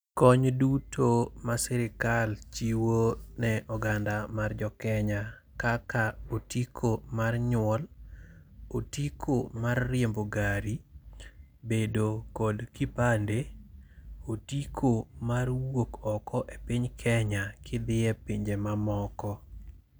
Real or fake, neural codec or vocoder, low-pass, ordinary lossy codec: real; none; none; none